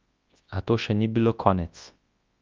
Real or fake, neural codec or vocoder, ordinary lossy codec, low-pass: fake; codec, 24 kHz, 0.9 kbps, WavTokenizer, large speech release; Opus, 32 kbps; 7.2 kHz